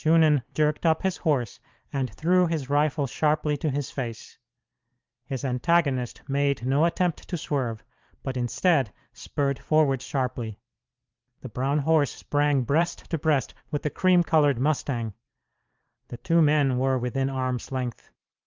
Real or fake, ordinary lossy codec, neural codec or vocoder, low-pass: fake; Opus, 24 kbps; vocoder, 44.1 kHz, 128 mel bands every 512 samples, BigVGAN v2; 7.2 kHz